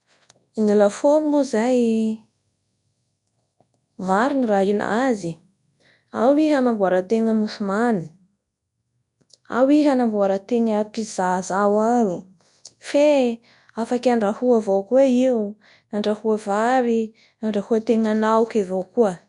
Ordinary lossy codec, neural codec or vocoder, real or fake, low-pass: none; codec, 24 kHz, 0.9 kbps, WavTokenizer, large speech release; fake; 10.8 kHz